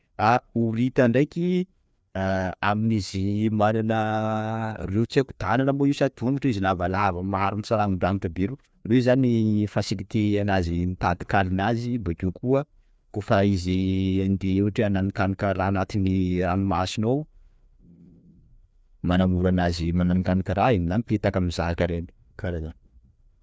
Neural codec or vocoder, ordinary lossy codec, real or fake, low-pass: codec, 16 kHz, 2 kbps, FreqCodec, larger model; none; fake; none